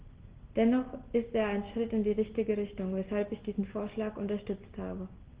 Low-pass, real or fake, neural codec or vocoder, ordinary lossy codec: 3.6 kHz; real; none; Opus, 16 kbps